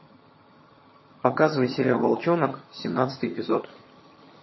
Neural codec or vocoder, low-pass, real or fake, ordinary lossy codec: vocoder, 22.05 kHz, 80 mel bands, HiFi-GAN; 7.2 kHz; fake; MP3, 24 kbps